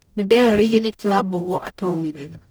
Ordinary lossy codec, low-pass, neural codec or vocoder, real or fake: none; none; codec, 44.1 kHz, 0.9 kbps, DAC; fake